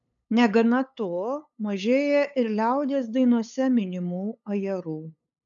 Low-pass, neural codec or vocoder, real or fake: 7.2 kHz; codec, 16 kHz, 8 kbps, FunCodec, trained on LibriTTS, 25 frames a second; fake